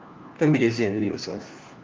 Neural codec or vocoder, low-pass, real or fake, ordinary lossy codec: codec, 16 kHz, 1 kbps, FunCodec, trained on LibriTTS, 50 frames a second; 7.2 kHz; fake; Opus, 32 kbps